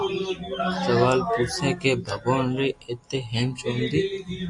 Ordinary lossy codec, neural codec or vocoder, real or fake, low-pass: AAC, 64 kbps; none; real; 10.8 kHz